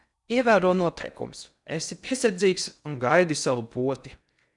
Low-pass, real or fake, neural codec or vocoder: 10.8 kHz; fake; codec, 16 kHz in and 24 kHz out, 0.6 kbps, FocalCodec, streaming, 4096 codes